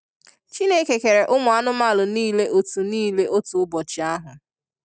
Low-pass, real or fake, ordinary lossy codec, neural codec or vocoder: none; real; none; none